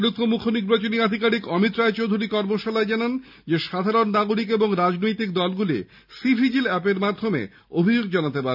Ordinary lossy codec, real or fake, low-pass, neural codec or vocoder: none; real; 5.4 kHz; none